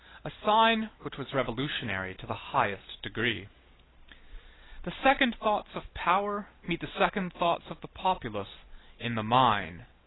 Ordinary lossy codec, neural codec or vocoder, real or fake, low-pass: AAC, 16 kbps; none; real; 7.2 kHz